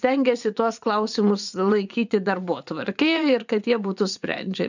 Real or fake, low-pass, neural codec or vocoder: fake; 7.2 kHz; vocoder, 24 kHz, 100 mel bands, Vocos